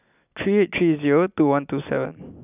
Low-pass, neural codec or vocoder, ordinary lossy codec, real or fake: 3.6 kHz; none; none; real